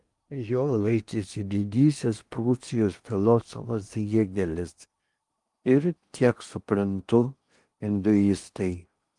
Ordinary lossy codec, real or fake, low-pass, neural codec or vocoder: Opus, 32 kbps; fake; 10.8 kHz; codec, 16 kHz in and 24 kHz out, 0.8 kbps, FocalCodec, streaming, 65536 codes